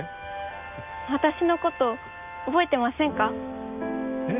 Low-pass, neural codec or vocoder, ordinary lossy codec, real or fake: 3.6 kHz; none; none; real